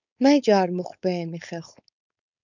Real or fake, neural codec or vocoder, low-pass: fake; codec, 16 kHz, 4.8 kbps, FACodec; 7.2 kHz